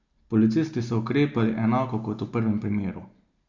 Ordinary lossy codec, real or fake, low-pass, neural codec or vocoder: Opus, 64 kbps; real; 7.2 kHz; none